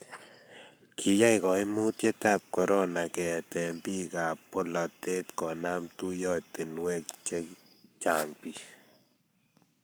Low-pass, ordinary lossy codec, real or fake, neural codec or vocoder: none; none; fake; codec, 44.1 kHz, 7.8 kbps, Pupu-Codec